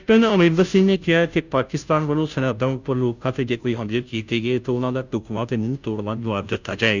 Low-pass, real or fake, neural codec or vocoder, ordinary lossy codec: 7.2 kHz; fake; codec, 16 kHz, 0.5 kbps, FunCodec, trained on Chinese and English, 25 frames a second; none